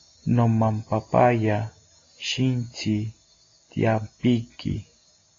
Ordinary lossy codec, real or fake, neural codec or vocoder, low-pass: AAC, 32 kbps; real; none; 7.2 kHz